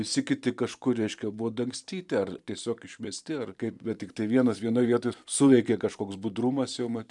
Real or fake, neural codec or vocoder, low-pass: fake; vocoder, 44.1 kHz, 128 mel bands every 512 samples, BigVGAN v2; 10.8 kHz